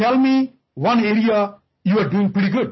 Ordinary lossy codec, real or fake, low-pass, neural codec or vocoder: MP3, 24 kbps; real; 7.2 kHz; none